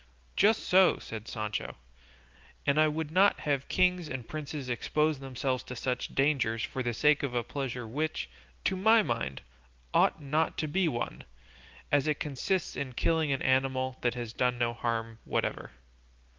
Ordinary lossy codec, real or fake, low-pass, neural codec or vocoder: Opus, 32 kbps; real; 7.2 kHz; none